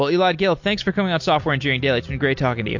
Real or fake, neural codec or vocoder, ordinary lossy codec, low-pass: real; none; MP3, 48 kbps; 7.2 kHz